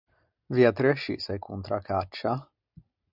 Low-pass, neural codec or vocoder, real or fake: 5.4 kHz; none; real